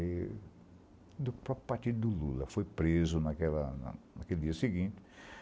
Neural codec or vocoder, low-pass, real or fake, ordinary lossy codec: none; none; real; none